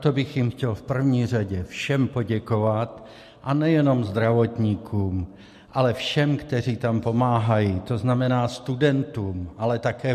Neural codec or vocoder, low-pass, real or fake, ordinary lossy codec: none; 14.4 kHz; real; MP3, 64 kbps